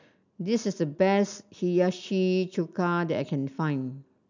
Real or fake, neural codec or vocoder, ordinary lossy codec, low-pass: real; none; none; 7.2 kHz